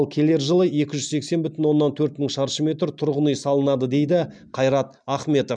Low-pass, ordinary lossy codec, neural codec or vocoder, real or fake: 9.9 kHz; none; none; real